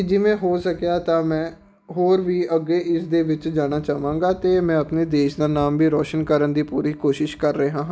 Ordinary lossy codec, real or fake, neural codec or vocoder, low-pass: none; real; none; none